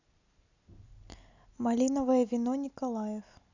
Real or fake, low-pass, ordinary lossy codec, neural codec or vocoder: real; 7.2 kHz; none; none